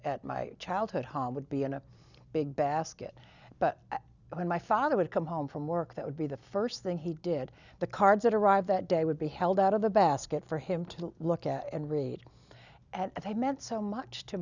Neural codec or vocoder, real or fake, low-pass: none; real; 7.2 kHz